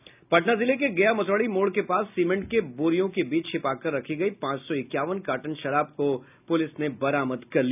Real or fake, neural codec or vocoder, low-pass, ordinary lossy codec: real; none; 3.6 kHz; none